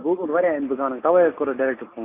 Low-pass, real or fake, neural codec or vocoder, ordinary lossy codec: 3.6 kHz; real; none; AAC, 24 kbps